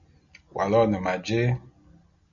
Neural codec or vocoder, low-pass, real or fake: none; 7.2 kHz; real